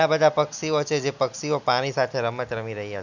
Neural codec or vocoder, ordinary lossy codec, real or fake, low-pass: none; none; real; 7.2 kHz